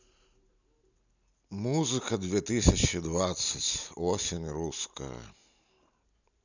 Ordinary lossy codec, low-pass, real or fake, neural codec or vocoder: none; 7.2 kHz; real; none